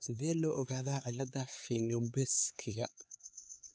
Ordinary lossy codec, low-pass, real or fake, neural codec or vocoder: none; none; fake; codec, 16 kHz, 4 kbps, X-Codec, HuBERT features, trained on LibriSpeech